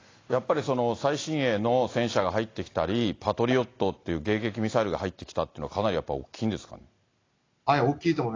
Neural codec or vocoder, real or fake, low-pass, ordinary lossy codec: none; real; 7.2 kHz; AAC, 32 kbps